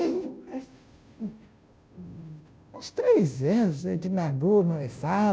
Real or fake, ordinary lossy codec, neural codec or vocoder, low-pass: fake; none; codec, 16 kHz, 0.5 kbps, FunCodec, trained on Chinese and English, 25 frames a second; none